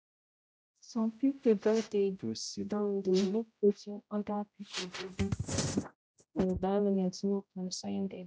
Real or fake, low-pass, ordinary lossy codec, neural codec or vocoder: fake; none; none; codec, 16 kHz, 0.5 kbps, X-Codec, HuBERT features, trained on general audio